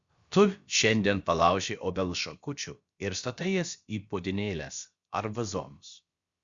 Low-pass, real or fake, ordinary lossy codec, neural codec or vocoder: 7.2 kHz; fake; Opus, 64 kbps; codec, 16 kHz, about 1 kbps, DyCAST, with the encoder's durations